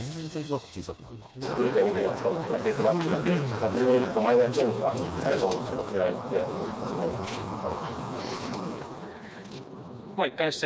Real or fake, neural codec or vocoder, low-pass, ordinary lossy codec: fake; codec, 16 kHz, 2 kbps, FreqCodec, smaller model; none; none